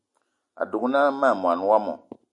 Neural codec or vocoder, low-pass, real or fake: none; 10.8 kHz; real